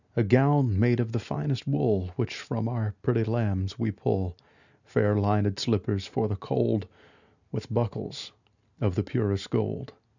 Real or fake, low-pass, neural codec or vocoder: real; 7.2 kHz; none